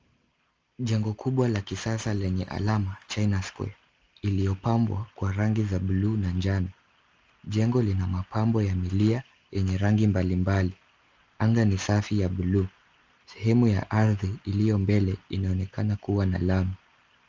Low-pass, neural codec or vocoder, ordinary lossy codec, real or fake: 7.2 kHz; none; Opus, 24 kbps; real